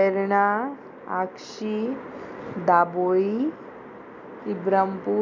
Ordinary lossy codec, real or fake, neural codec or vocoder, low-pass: none; real; none; 7.2 kHz